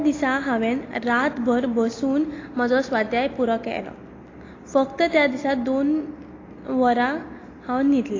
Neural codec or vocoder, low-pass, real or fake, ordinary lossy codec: none; 7.2 kHz; real; AAC, 32 kbps